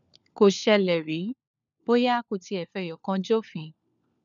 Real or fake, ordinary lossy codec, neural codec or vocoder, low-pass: fake; none; codec, 16 kHz, 4 kbps, FunCodec, trained on LibriTTS, 50 frames a second; 7.2 kHz